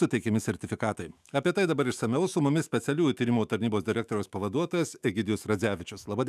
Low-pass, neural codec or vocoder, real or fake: 14.4 kHz; autoencoder, 48 kHz, 128 numbers a frame, DAC-VAE, trained on Japanese speech; fake